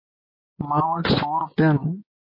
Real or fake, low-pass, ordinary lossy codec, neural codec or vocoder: fake; 5.4 kHz; MP3, 24 kbps; vocoder, 24 kHz, 100 mel bands, Vocos